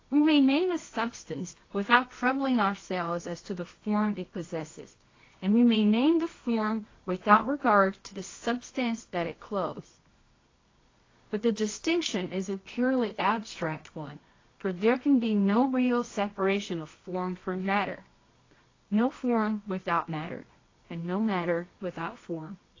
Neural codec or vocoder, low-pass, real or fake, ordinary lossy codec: codec, 24 kHz, 0.9 kbps, WavTokenizer, medium music audio release; 7.2 kHz; fake; AAC, 32 kbps